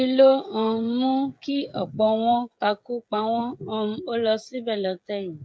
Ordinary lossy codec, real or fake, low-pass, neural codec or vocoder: none; fake; none; codec, 16 kHz, 16 kbps, FreqCodec, smaller model